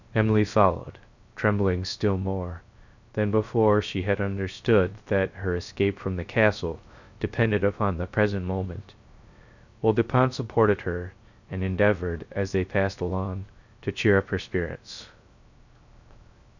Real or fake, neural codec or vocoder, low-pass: fake; codec, 16 kHz, 0.3 kbps, FocalCodec; 7.2 kHz